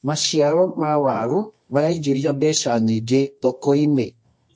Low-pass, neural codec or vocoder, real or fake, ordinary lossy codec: 9.9 kHz; codec, 24 kHz, 0.9 kbps, WavTokenizer, medium music audio release; fake; MP3, 48 kbps